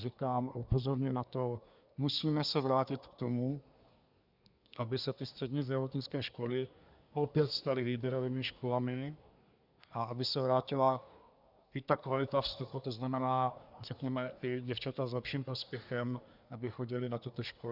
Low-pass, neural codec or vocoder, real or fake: 5.4 kHz; codec, 24 kHz, 1 kbps, SNAC; fake